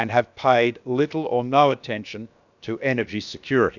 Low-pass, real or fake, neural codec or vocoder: 7.2 kHz; fake; codec, 16 kHz, about 1 kbps, DyCAST, with the encoder's durations